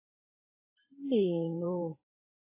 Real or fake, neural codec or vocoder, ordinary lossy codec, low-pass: fake; vocoder, 44.1 kHz, 128 mel bands every 512 samples, BigVGAN v2; MP3, 16 kbps; 3.6 kHz